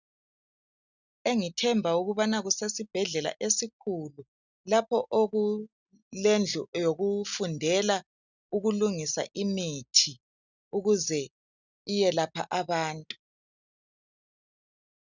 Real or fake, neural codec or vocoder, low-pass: real; none; 7.2 kHz